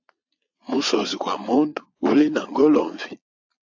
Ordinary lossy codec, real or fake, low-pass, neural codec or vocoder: AAC, 48 kbps; fake; 7.2 kHz; vocoder, 22.05 kHz, 80 mel bands, Vocos